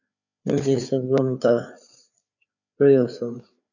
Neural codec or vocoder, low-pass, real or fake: codec, 16 kHz, 4 kbps, FreqCodec, larger model; 7.2 kHz; fake